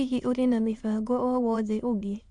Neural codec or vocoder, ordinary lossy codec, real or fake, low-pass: autoencoder, 22.05 kHz, a latent of 192 numbers a frame, VITS, trained on many speakers; Opus, 64 kbps; fake; 9.9 kHz